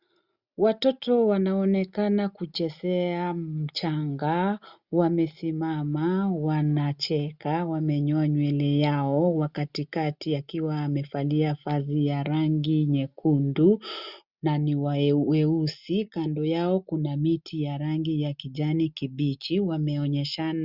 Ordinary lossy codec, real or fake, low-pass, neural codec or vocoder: Opus, 64 kbps; real; 5.4 kHz; none